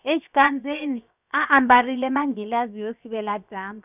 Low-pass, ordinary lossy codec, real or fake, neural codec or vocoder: 3.6 kHz; none; fake; codec, 16 kHz, 0.7 kbps, FocalCodec